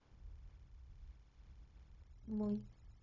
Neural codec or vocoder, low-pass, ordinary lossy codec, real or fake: codec, 16 kHz, 0.4 kbps, LongCat-Audio-Codec; 7.2 kHz; none; fake